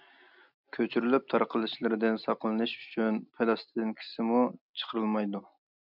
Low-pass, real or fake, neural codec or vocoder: 5.4 kHz; real; none